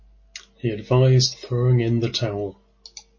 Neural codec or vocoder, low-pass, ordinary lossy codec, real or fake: none; 7.2 kHz; MP3, 32 kbps; real